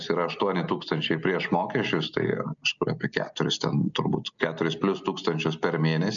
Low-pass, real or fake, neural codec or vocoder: 7.2 kHz; real; none